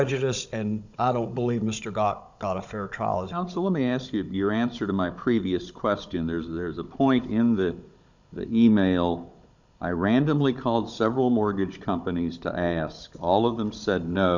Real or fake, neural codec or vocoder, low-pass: fake; codec, 16 kHz, 16 kbps, FunCodec, trained on Chinese and English, 50 frames a second; 7.2 kHz